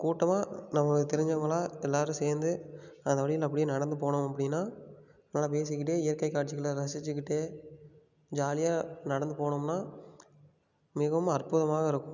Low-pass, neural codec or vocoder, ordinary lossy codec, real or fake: 7.2 kHz; none; none; real